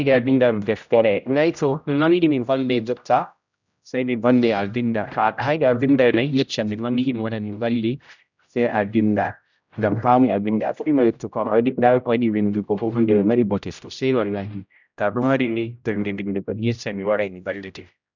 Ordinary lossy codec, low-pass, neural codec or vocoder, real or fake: none; 7.2 kHz; codec, 16 kHz, 0.5 kbps, X-Codec, HuBERT features, trained on general audio; fake